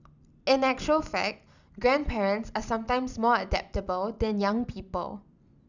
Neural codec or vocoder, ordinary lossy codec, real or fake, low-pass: none; none; real; 7.2 kHz